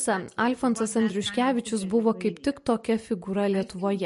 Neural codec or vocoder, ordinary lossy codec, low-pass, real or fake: none; MP3, 48 kbps; 14.4 kHz; real